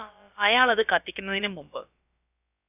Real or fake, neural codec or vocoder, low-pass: fake; codec, 16 kHz, about 1 kbps, DyCAST, with the encoder's durations; 3.6 kHz